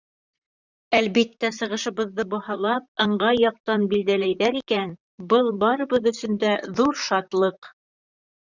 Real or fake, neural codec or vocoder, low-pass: fake; vocoder, 44.1 kHz, 128 mel bands, Pupu-Vocoder; 7.2 kHz